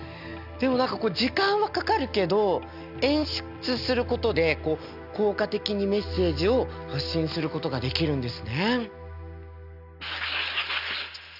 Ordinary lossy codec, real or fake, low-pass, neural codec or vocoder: none; real; 5.4 kHz; none